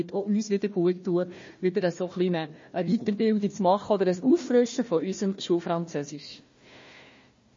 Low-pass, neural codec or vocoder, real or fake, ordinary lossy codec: 7.2 kHz; codec, 16 kHz, 1 kbps, FunCodec, trained on Chinese and English, 50 frames a second; fake; MP3, 32 kbps